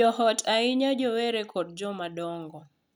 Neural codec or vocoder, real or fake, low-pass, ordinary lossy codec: none; real; 19.8 kHz; none